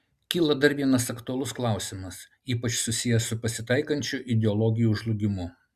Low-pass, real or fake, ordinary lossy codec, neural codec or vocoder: 14.4 kHz; real; Opus, 64 kbps; none